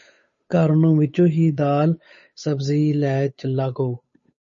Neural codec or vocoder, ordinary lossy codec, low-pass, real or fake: codec, 16 kHz, 8 kbps, FunCodec, trained on Chinese and English, 25 frames a second; MP3, 32 kbps; 7.2 kHz; fake